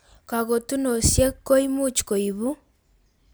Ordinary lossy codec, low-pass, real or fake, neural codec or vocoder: none; none; real; none